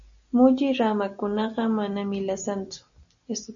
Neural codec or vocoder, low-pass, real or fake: none; 7.2 kHz; real